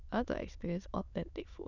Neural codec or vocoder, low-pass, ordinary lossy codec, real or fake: autoencoder, 22.05 kHz, a latent of 192 numbers a frame, VITS, trained on many speakers; 7.2 kHz; none; fake